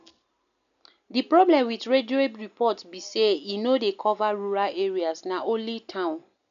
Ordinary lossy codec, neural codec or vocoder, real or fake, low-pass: none; none; real; 7.2 kHz